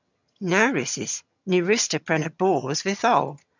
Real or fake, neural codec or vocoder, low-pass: fake; vocoder, 22.05 kHz, 80 mel bands, HiFi-GAN; 7.2 kHz